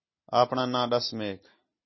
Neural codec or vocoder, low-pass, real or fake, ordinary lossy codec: none; 7.2 kHz; real; MP3, 24 kbps